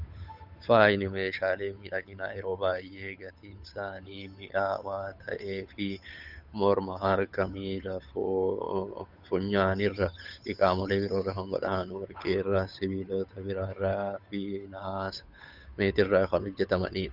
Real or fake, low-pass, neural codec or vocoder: fake; 5.4 kHz; codec, 16 kHz, 8 kbps, FunCodec, trained on Chinese and English, 25 frames a second